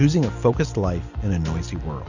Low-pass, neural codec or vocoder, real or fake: 7.2 kHz; none; real